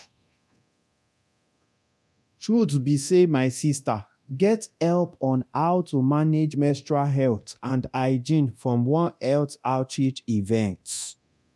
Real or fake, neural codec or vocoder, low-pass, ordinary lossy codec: fake; codec, 24 kHz, 0.9 kbps, DualCodec; none; none